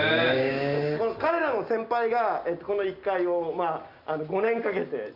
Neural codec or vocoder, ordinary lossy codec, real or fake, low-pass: none; Opus, 64 kbps; real; 5.4 kHz